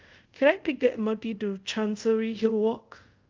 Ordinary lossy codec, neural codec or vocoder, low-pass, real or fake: Opus, 32 kbps; codec, 24 kHz, 0.5 kbps, DualCodec; 7.2 kHz; fake